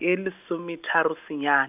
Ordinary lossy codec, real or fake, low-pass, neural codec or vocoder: none; real; 3.6 kHz; none